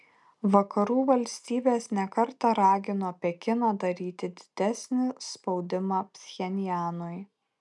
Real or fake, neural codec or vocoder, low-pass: real; none; 10.8 kHz